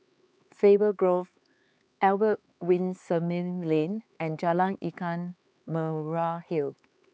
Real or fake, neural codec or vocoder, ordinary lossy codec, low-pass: fake; codec, 16 kHz, 4 kbps, X-Codec, HuBERT features, trained on LibriSpeech; none; none